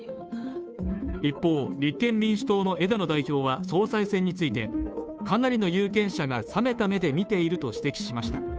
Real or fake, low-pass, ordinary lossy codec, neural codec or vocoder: fake; none; none; codec, 16 kHz, 2 kbps, FunCodec, trained on Chinese and English, 25 frames a second